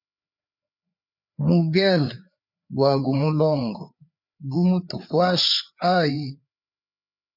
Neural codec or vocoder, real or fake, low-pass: codec, 16 kHz, 4 kbps, FreqCodec, larger model; fake; 5.4 kHz